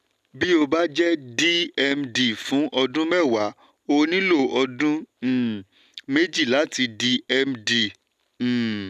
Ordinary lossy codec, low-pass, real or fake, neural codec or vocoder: none; 14.4 kHz; real; none